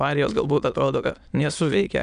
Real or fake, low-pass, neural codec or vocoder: fake; 9.9 kHz; autoencoder, 22.05 kHz, a latent of 192 numbers a frame, VITS, trained on many speakers